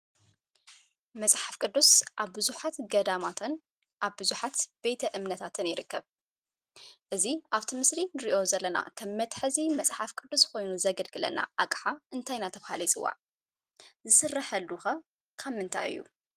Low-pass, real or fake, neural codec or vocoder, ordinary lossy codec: 14.4 kHz; real; none; Opus, 24 kbps